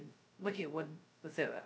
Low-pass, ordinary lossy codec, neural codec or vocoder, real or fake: none; none; codec, 16 kHz, 0.2 kbps, FocalCodec; fake